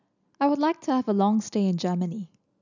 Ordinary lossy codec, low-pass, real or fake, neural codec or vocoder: none; 7.2 kHz; real; none